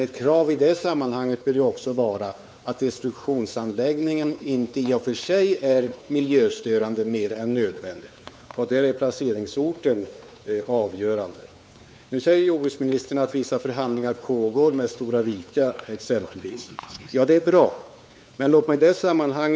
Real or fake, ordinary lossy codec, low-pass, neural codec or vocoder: fake; none; none; codec, 16 kHz, 4 kbps, X-Codec, WavLM features, trained on Multilingual LibriSpeech